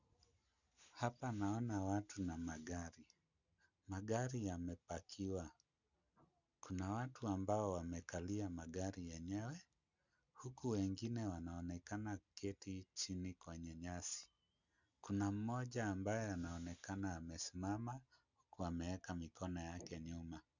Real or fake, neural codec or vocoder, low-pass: real; none; 7.2 kHz